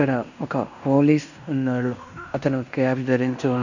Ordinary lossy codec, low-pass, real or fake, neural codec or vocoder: none; 7.2 kHz; fake; codec, 16 kHz in and 24 kHz out, 0.9 kbps, LongCat-Audio-Codec, fine tuned four codebook decoder